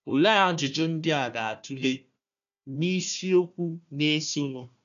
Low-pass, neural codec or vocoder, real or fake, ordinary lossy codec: 7.2 kHz; codec, 16 kHz, 1 kbps, FunCodec, trained on Chinese and English, 50 frames a second; fake; none